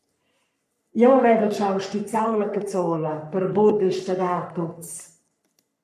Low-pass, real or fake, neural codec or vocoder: 14.4 kHz; fake; codec, 44.1 kHz, 3.4 kbps, Pupu-Codec